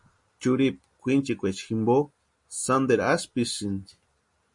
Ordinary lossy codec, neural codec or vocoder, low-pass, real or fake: MP3, 48 kbps; none; 10.8 kHz; real